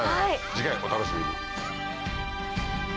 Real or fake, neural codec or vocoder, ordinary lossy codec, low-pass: real; none; none; none